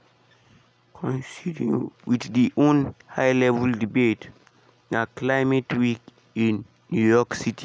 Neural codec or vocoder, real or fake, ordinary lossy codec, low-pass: none; real; none; none